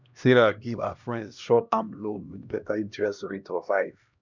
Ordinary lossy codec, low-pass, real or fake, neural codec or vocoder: none; 7.2 kHz; fake; codec, 16 kHz, 1 kbps, X-Codec, HuBERT features, trained on LibriSpeech